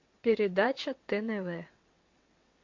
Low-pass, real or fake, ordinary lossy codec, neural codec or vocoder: 7.2 kHz; fake; MP3, 48 kbps; vocoder, 22.05 kHz, 80 mel bands, WaveNeXt